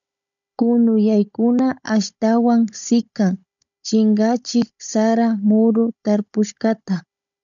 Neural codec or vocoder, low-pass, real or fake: codec, 16 kHz, 16 kbps, FunCodec, trained on Chinese and English, 50 frames a second; 7.2 kHz; fake